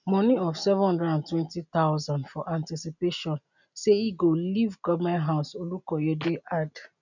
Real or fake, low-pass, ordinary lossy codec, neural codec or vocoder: real; 7.2 kHz; none; none